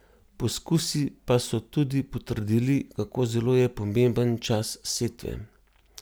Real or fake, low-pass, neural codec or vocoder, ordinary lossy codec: real; none; none; none